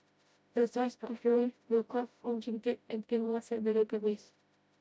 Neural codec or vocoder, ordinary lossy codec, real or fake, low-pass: codec, 16 kHz, 0.5 kbps, FreqCodec, smaller model; none; fake; none